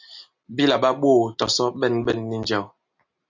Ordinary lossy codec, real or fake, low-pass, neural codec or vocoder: MP3, 64 kbps; real; 7.2 kHz; none